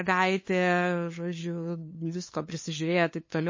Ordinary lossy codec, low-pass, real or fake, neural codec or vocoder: MP3, 32 kbps; 7.2 kHz; fake; codec, 16 kHz, 2 kbps, FunCodec, trained on LibriTTS, 25 frames a second